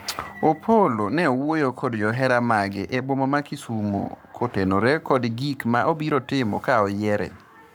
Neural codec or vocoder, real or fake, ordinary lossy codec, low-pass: codec, 44.1 kHz, 7.8 kbps, Pupu-Codec; fake; none; none